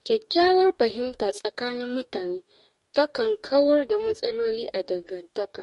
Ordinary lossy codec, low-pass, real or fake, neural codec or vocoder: MP3, 48 kbps; 14.4 kHz; fake; codec, 44.1 kHz, 2.6 kbps, DAC